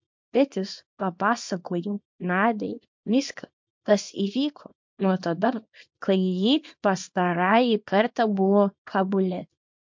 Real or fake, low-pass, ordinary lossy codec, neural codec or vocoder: fake; 7.2 kHz; MP3, 48 kbps; codec, 24 kHz, 0.9 kbps, WavTokenizer, small release